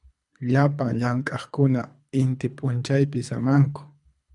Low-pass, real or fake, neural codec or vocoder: 10.8 kHz; fake; codec, 24 kHz, 3 kbps, HILCodec